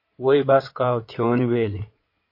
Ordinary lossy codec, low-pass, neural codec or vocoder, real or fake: MP3, 32 kbps; 5.4 kHz; codec, 16 kHz in and 24 kHz out, 2.2 kbps, FireRedTTS-2 codec; fake